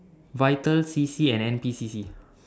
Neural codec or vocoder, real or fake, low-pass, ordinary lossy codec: none; real; none; none